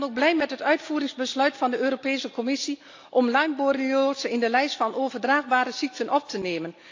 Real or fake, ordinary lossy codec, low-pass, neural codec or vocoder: real; AAC, 48 kbps; 7.2 kHz; none